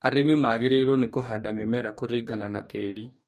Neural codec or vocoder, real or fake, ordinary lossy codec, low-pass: codec, 44.1 kHz, 2.6 kbps, DAC; fake; MP3, 64 kbps; 14.4 kHz